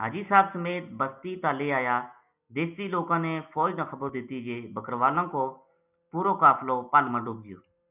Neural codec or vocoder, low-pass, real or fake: none; 3.6 kHz; real